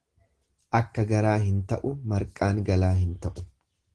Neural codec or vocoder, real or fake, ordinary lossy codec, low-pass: none; real; Opus, 16 kbps; 10.8 kHz